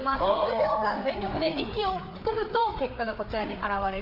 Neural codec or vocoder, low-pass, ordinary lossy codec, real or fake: codec, 16 kHz, 4 kbps, FreqCodec, larger model; 5.4 kHz; none; fake